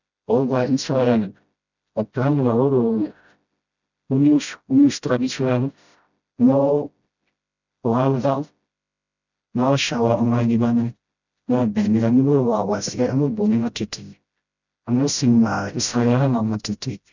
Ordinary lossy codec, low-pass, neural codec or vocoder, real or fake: none; 7.2 kHz; codec, 16 kHz, 0.5 kbps, FreqCodec, smaller model; fake